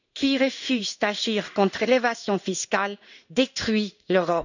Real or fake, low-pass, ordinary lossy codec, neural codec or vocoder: fake; 7.2 kHz; none; codec, 16 kHz in and 24 kHz out, 1 kbps, XY-Tokenizer